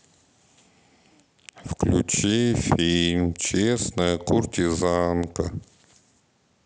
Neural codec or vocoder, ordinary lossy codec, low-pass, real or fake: none; none; none; real